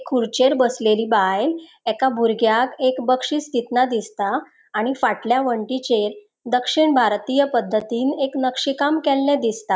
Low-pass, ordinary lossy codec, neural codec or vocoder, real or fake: none; none; none; real